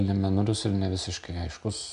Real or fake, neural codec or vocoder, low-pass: real; none; 10.8 kHz